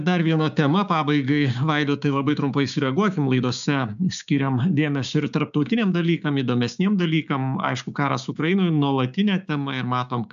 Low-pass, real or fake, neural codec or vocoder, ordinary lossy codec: 7.2 kHz; fake; codec, 16 kHz, 6 kbps, DAC; AAC, 96 kbps